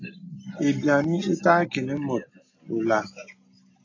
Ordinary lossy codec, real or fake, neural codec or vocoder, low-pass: AAC, 32 kbps; real; none; 7.2 kHz